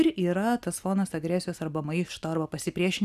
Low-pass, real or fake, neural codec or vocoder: 14.4 kHz; real; none